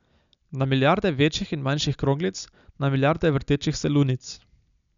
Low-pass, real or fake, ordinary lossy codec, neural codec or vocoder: 7.2 kHz; real; none; none